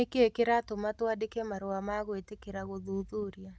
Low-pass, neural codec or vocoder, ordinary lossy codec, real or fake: none; none; none; real